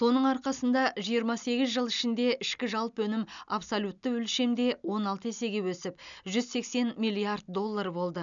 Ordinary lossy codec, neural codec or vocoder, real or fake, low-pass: none; none; real; 7.2 kHz